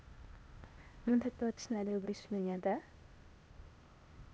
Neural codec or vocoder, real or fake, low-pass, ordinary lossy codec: codec, 16 kHz, 0.8 kbps, ZipCodec; fake; none; none